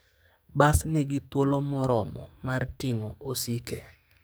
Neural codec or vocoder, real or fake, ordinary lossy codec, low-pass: codec, 44.1 kHz, 2.6 kbps, SNAC; fake; none; none